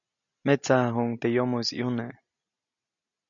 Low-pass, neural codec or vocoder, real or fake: 7.2 kHz; none; real